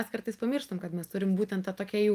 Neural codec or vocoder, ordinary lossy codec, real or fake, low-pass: none; Opus, 32 kbps; real; 14.4 kHz